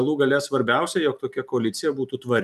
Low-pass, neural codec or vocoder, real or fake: 14.4 kHz; vocoder, 44.1 kHz, 128 mel bands every 256 samples, BigVGAN v2; fake